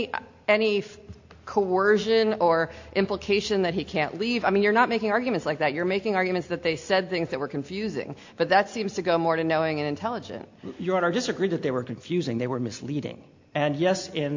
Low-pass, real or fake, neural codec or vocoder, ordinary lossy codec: 7.2 kHz; real; none; AAC, 48 kbps